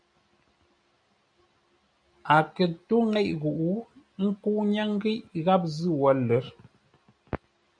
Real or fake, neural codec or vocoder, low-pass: real; none; 9.9 kHz